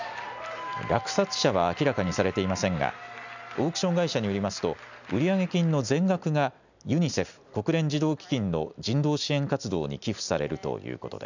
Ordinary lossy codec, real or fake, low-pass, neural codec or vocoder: none; real; 7.2 kHz; none